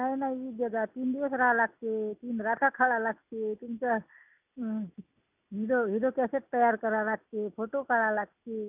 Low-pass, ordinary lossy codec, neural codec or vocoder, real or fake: 3.6 kHz; none; none; real